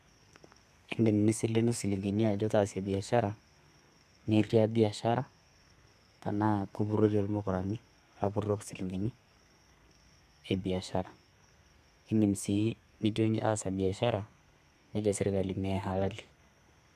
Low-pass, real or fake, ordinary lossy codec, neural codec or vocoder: 14.4 kHz; fake; none; codec, 32 kHz, 1.9 kbps, SNAC